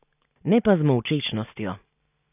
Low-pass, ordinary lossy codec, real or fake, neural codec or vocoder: 3.6 kHz; none; real; none